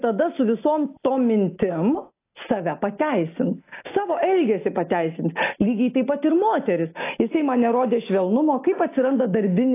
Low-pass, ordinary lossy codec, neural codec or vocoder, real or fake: 3.6 kHz; AAC, 24 kbps; none; real